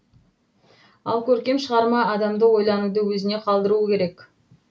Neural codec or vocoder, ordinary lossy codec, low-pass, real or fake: none; none; none; real